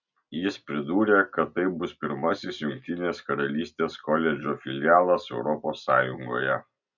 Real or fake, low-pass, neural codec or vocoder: real; 7.2 kHz; none